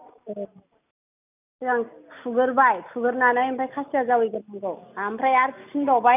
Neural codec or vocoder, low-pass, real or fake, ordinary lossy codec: none; 3.6 kHz; real; none